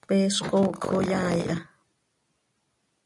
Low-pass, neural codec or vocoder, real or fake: 10.8 kHz; none; real